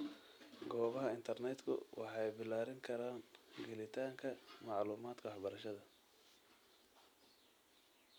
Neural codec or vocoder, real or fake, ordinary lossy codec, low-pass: none; real; none; none